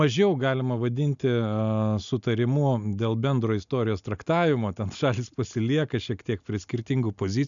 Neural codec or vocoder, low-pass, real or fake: none; 7.2 kHz; real